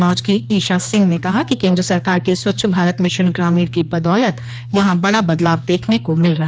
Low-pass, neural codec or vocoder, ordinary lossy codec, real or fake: none; codec, 16 kHz, 2 kbps, X-Codec, HuBERT features, trained on general audio; none; fake